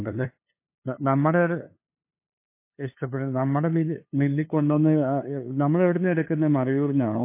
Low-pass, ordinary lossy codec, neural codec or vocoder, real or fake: 3.6 kHz; MP3, 24 kbps; codec, 16 kHz, 2 kbps, FunCodec, trained on LibriTTS, 25 frames a second; fake